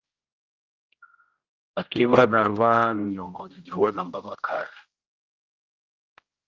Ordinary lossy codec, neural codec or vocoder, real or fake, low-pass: Opus, 32 kbps; codec, 16 kHz, 0.5 kbps, X-Codec, HuBERT features, trained on general audio; fake; 7.2 kHz